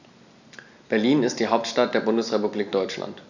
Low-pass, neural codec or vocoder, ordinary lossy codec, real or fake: 7.2 kHz; none; none; real